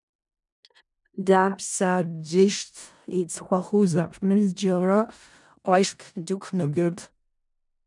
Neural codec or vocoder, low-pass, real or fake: codec, 16 kHz in and 24 kHz out, 0.4 kbps, LongCat-Audio-Codec, four codebook decoder; 10.8 kHz; fake